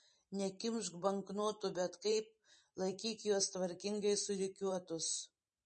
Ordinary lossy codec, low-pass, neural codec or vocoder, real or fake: MP3, 32 kbps; 10.8 kHz; vocoder, 44.1 kHz, 128 mel bands every 512 samples, BigVGAN v2; fake